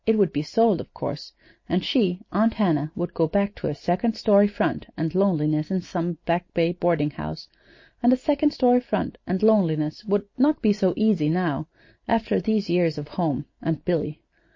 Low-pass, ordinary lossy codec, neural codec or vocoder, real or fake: 7.2 kHz; MP3, 32 kbps; none; real